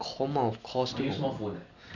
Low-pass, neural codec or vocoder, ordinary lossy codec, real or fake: 7.2 kHz; none; none; real